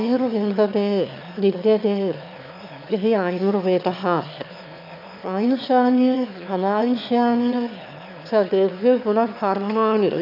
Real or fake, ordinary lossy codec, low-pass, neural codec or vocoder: fake; MP3, 48 kbps; 5.4 kHz; autoencoder, 22.05 kHz, a latent of 192 numbers a frame, VITS, trained on one speaker